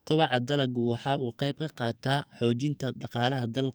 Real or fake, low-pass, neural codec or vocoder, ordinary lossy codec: fake; none; codec, 44.1 kHz, 2.6 kbps, SNAC; none